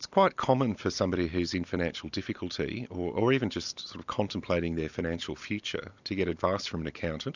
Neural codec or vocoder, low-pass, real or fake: none; 7.2 kHz; real